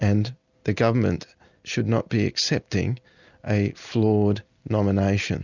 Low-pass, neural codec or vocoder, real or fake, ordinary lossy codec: 7.2 kHz; none; real; Opus, 64 kbps